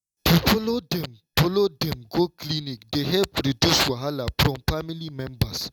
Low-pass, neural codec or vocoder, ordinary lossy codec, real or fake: 19.8 kHz; none; none; real